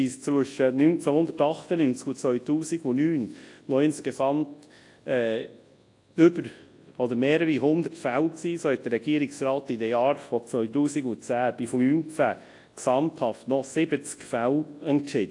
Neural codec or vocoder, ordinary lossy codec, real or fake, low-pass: codec, 24 kHz, 0.9 kbps, WavTokenizer, large speech release; AAC, 48 kbps; fake; 10.8 kHz